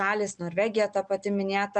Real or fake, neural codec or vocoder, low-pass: real; none; 9.9 kHz